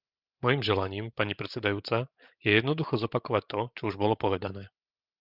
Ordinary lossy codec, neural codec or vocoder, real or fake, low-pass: Opus, 24 kbps; codec, 16 kHz, 16 kbps, FreqCodec, larger model; fake; 5.4 kHz